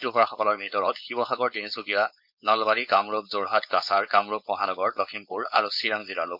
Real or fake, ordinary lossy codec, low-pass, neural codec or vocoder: fake; none; 5.4 kHz; codec, 16 kHz, 4.8 kbps, FACodec